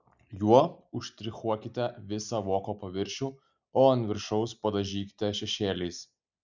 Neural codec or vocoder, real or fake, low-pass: none; real; 7.2 kHz